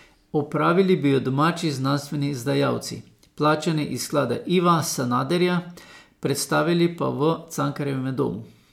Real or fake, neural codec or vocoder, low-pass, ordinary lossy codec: real; none; 19.8 kHz; MP3, 96 kbps